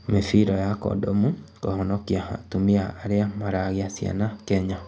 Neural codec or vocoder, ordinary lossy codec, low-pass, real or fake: none; none; none; real